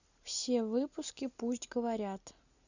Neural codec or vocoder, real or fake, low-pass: none; real; 7.2 kHz